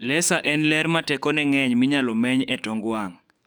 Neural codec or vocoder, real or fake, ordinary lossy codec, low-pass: codec, 44.1 kHz, 7.8 kbps, DAC; fake; none; none